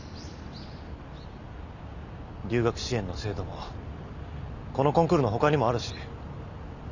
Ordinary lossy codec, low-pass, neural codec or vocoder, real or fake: none; 7.2 kHz; none; real